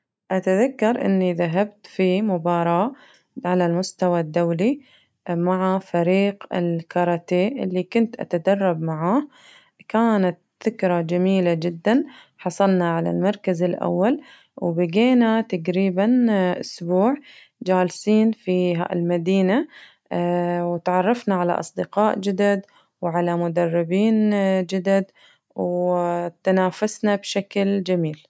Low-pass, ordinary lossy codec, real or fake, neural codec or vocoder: none; none; real; none